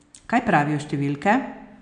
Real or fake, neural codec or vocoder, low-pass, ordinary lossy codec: real; none; 9.9 kHz; none